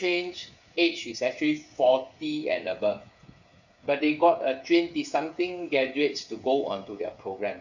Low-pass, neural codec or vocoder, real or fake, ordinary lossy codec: 7.2 kHz; codec, 16 kHz, 8 kbps, FreqCodec, smaller model; fake; none